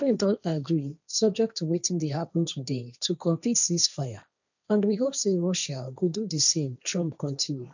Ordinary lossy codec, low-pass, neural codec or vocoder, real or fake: none; 7.2 kHz; codec, 16 kHz, 1.1 kbps, Voila-Tokenizer; fake